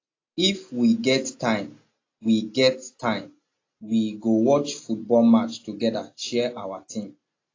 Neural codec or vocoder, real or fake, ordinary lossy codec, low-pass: none; real; AAC, 32 kbps; 7.2 kHz